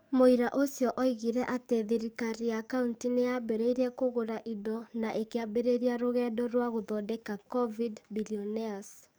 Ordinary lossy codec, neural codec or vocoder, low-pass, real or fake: none; codec, 44.1 kHz, 7.8 kbps, DAC; none; fake